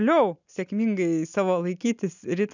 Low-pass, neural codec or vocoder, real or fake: 7.2 kHz; none; real